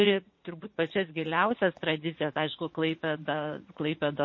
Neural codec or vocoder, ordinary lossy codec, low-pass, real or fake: vocoder, 44.1 kHz, 80 mel bands, Vocos; MP3, 32 kbps; 7.2 kHz; fake